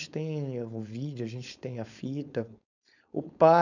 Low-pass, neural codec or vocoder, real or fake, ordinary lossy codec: 7.2 kHz; codec, 16 kHz, 4.8 kbps, FACodec; fake; none